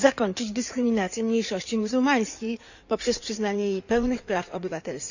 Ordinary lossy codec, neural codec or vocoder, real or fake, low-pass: none; codec, 16 kHz in and 24 kHz out, 2.2 kbps, FireRedTTS-2 codec; fake; 7.2 kHz